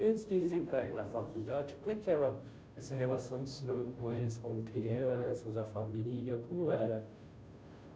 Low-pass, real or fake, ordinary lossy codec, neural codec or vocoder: none; fake; none; codec, 16 kHz, 0.5 kbps, FunCodec, trained on Chinese and English, 25 frames a second